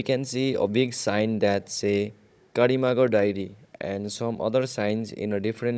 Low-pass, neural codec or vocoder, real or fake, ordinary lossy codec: none; codec, 16 kHz, 16 kbps, FunCodec, trained on Chinese and English, 50 frames a second; fake; none